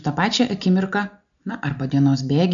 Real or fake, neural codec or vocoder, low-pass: real; none; 7.2 kHz